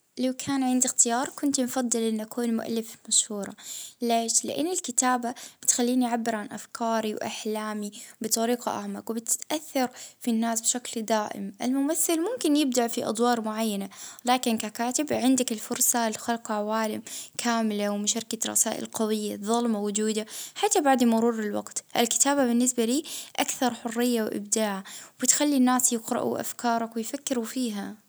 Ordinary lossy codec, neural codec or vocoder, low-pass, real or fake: none; none; none; real